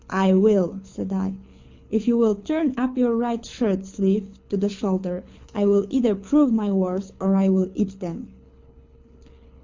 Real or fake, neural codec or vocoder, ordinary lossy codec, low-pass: fake; codec, 24 kHz, 6 kbps, HILCodec; MP3, 64 kbps; 7.2 kHz